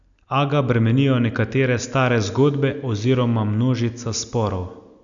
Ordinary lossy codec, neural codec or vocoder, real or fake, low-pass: none; none; real; 7.2 kHz